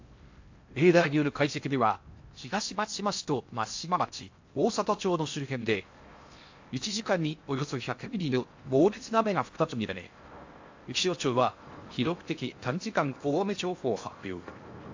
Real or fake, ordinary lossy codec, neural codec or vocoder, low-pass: fake; AAC, 48 kbps; codec, 16 kHz in and 24 kHz out, 0.6 kbps, FocalCodec, streaming, 4096 codes; 7.2 kHz